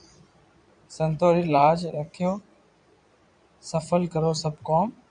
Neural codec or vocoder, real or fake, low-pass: vocoder, 22.05 kHz, 80 mel bands, Vocos; fake; 9.9 kHz